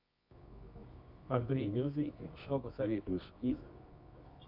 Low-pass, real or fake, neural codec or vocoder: 5.4 kHz; fake; codec, 24 kHz, 0.9 kbps, WavTokenizer, medium music audio release